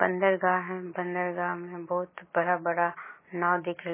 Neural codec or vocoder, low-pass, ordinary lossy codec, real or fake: none; 3.6 kHz; MP3, 16 kbps; real